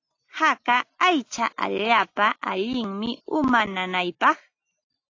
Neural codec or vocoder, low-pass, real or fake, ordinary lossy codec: none; 7.2 kHz; real; AAC, 48 kbps